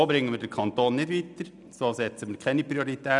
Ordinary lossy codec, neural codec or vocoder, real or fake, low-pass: none; none; real; 10.8 kHz